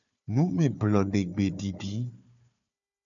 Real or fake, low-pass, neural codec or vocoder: fake; 7.2 kHz; codec, 16 kHz, 4 kbps, FunCodec, trained on Chinese and English, 50 frames a second